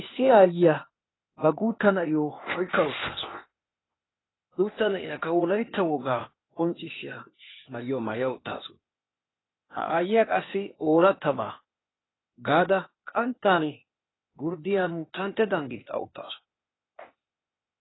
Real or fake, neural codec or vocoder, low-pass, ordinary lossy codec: fake; codec, 16 kHz, 0.8 kbps, ZipCodec; 7.2 kHz; AAC, 16 kbps